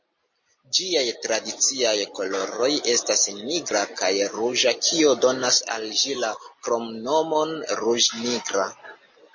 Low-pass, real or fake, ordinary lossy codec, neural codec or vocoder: 7.2 kHz; real; MP3, 32 kbps; none